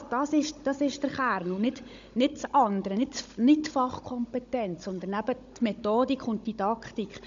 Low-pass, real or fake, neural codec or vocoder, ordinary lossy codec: 7.2 kHz; fake; codec, 16 kHz, 16 kbps, FunCodec, trained on Chinese and English, 50 frames a second; MP3, 64 kbps